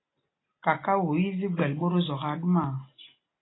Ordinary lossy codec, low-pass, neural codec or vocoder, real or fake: AAC, 16 kbps; 7.2 kHz; none; real